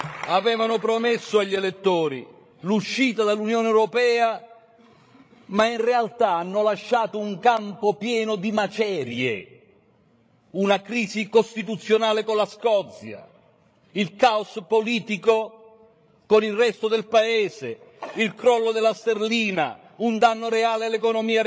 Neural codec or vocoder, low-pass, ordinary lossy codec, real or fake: codec, 16 kHz, 16 kbps, FreqCodec, larger model; none; none; fake